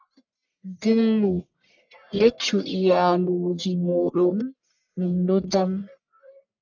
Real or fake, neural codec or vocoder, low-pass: fake; codec, 44.1 kHz, 1.7 kbps, Pupu-Codec; 7.2 kHz